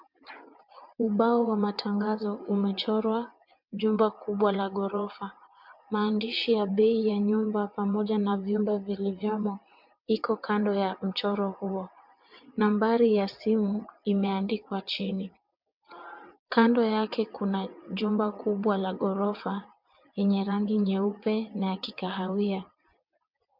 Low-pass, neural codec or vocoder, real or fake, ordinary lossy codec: 5.4 kHz; vocoder, 22.05 kHz, 80 mel bands, WaveNeXt; fake; MP3, 48 kbps